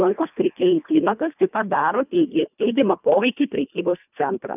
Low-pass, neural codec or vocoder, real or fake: 3.6 kHz; codec, 24 kHz, 1.5 kbps, HILCodec; fake